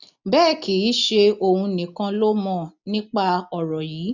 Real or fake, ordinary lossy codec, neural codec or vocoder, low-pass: real; none; none; 7.2 kHz